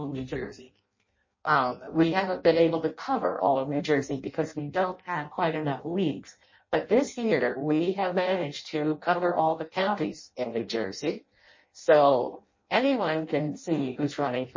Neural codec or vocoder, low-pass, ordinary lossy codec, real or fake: codec, 16 kHz in and 24 kHz out, 0.6 kbps, FireRedTTS-2 codec; 7.2 kHz; MP3, 32 kbps; fake